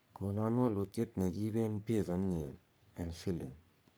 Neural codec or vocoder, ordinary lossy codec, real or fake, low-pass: codec, 44.1 kHz, 3.4 kbps, Pupu-Codec; none; fake; none